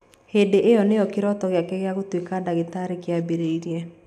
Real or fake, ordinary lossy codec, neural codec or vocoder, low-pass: real; none; none; 14.4 kHz